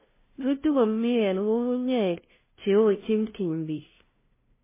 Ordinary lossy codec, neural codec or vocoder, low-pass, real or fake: MP3, 16 kbps; codec, 16 kHz, 0.5 kbps, FunCodec, trained on LibriTTS, 25 frames a second; 3.6 kHz; fake